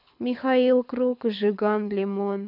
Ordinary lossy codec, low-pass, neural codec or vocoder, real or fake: none; 5.4 kHz; codec, 24 kHz, 6 kbps, HILCodec; fake